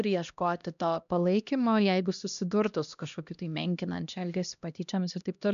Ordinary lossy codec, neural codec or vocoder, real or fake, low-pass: MP3, 96 kbps; codec, 16 kHz, 1 kbps, X-Codec, HuBERT features, trained on LibriSpeech; fake; 7.2 kHz